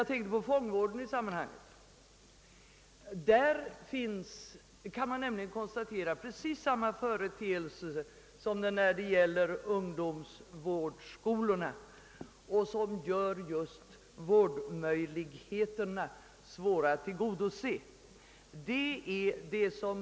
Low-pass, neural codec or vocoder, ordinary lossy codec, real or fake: none; none; none; real